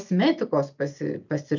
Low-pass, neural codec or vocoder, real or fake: 7.2 kHz; none; real